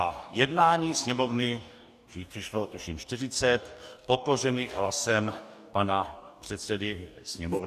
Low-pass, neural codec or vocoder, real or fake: 14.4 kHz; codec, 44.1 kHz, 2.6 kbps, DAC; fake